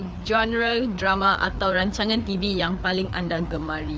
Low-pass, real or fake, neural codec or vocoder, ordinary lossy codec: none; fake; codec, 16 kHz, 4 kbps, FreqCodec, larger model; none